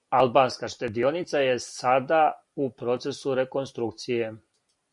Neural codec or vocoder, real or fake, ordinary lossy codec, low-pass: none; real; MP3, 96 kbps; 10.8 kHz